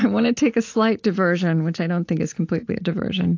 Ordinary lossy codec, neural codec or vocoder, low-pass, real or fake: AAC, 48 kbps; none; 7.2 kHz; real